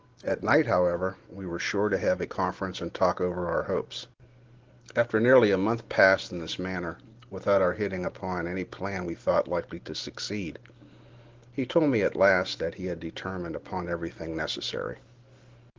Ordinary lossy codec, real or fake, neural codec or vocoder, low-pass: Opus, 16 kbps; real; none; 7.2 kHz